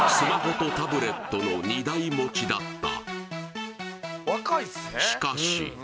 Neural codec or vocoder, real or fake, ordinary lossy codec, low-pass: none; real; none; none